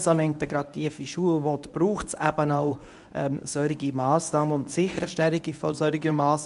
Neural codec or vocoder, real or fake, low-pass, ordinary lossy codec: codec, 24 kHz, 0.9 kbps, WavTokenizer, medium speech release version 1; fake; 10.8 kHz; none